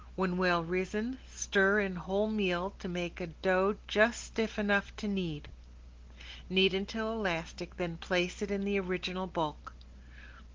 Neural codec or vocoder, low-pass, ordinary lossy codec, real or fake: none; 7.2 kHz; Opus, 16 kbps; real